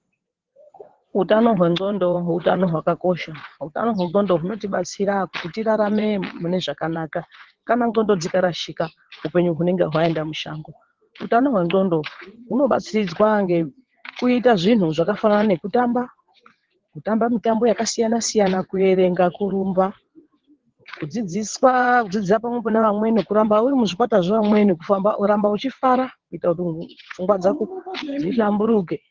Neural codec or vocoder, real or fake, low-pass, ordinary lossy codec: vocoder, 22.05 kHz, 80 mel bands, WaveNeXt; fake; 7.2 kHz; Opus, 16 kbps